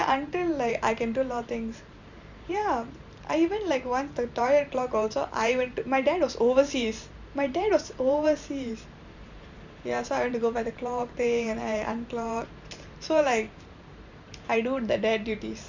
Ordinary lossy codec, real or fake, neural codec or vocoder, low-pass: Opus, 64 kbps; fake; vocoder, 44.1 kHz, 128 mel bands every 512 samples, BigVGAN v2; 7.2 kHz